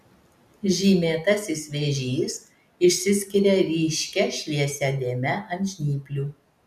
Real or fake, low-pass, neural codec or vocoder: real; 14.4 kHz; none